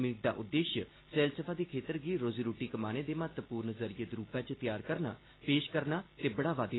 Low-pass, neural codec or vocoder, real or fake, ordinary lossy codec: 7.2 kHz; none; real; AAC, 16 kbps